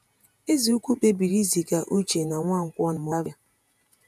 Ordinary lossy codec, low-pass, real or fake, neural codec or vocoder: none; 14.4 kHz; fake; vocoder, 48 kHz, 128 mel bands, Vocos